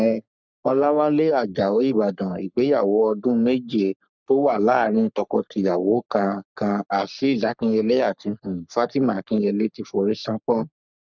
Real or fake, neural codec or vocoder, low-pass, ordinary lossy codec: fake; codec, 44.1 kHz, 3.4 kbps, Pupu-Codec; 7.2 kHz; none